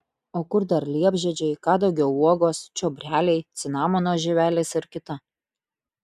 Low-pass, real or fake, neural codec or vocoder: 14.4 kHz; real; none